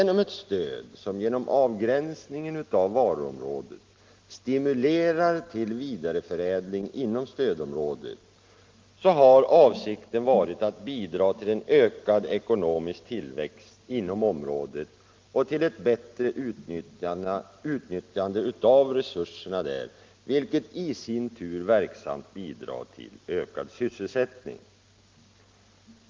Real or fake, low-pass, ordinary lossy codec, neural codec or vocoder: real; 7.2 kHz; Opus, 32 kbps; none